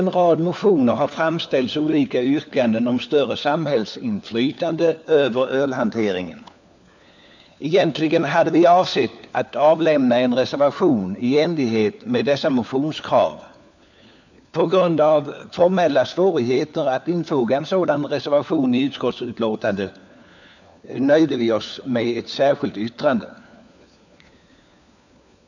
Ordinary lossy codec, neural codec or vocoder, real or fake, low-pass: none; codec, 16 kHz, 4 kbps, FunCodec, trained on LibriTTS, 50 frames a second; fake; 7.2 kHz